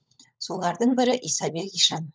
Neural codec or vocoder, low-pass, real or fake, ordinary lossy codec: codec, 16 kHz, 16 kbps, FunCodec, trained on LibriTTS, 50 frames a second; none; fake; none